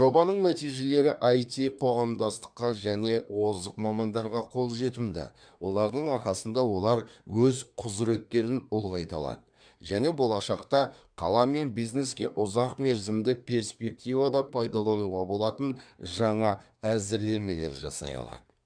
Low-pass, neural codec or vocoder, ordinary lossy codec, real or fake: 9.9 kHz; codec, 24 kHz, 1 kbps, SNAC; none; fake